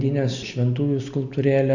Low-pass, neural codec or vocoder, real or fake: 7.2 kHz; none; real